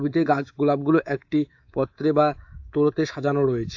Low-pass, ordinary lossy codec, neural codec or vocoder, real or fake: 7.2 kHz; AAC, 48 kbps; codec, 24 kHz, 3.1 kbps, DualCodec; fake